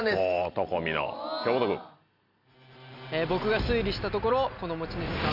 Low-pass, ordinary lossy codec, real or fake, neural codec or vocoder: 5.4 kHz; none; real; none